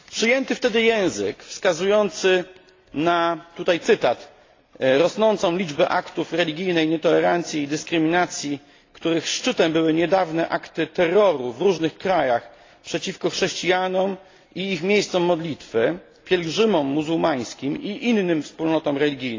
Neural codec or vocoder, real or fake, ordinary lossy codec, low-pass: none; real; AAC, 32 kbps; 7.2 kHz